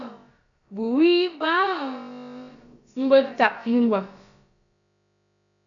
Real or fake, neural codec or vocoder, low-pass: fake; codec, 16 kHz, about 1 kbps, DyCAST, with the encoder's durations; 7.2 kHz